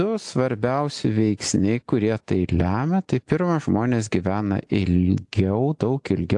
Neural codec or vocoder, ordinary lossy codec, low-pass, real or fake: none; AAC, 64 kbps; 10.8 kHz; real